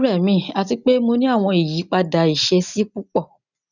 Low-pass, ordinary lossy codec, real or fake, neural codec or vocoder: 7.2 kHz; none; real; none